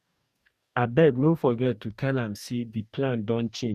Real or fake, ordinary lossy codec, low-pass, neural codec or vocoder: fake; Opus, 64 kbps; 14.4 kHz; codec, 44.1 kHz, 2.6 kbps, DAC